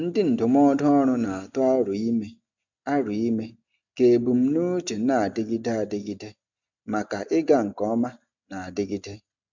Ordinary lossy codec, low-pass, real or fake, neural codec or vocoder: none; 7.2 kHz; real; none